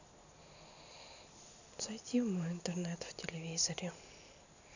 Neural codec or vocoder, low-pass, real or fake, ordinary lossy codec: none; 7.2 kHz; real; none